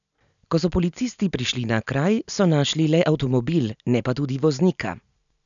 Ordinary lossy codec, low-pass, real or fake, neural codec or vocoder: none; 7.2 kHz; real; none